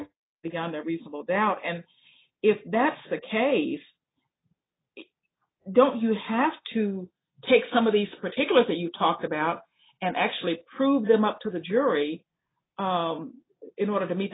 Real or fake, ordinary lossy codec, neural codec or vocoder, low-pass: real; AAC, 16 kbps; none; 7.2 kHz